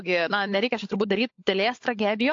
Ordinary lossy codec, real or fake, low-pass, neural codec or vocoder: AAC, 48 kbps; fake; 7.2 kHz; codec, 16 kHz, 16 kbps, FunCodec, trained on LibriTTS, 50 frames a second